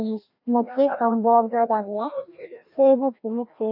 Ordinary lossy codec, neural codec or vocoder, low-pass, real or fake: AAC, 48 kbps; codec, 16 kHz, 1 kbps, FreqCodec, larger model; 5.4 kHz; fake